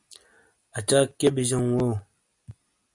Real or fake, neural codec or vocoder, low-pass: real; none; 10.8 kHz